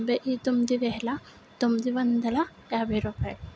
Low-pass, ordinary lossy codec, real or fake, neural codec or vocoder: none; none; real; none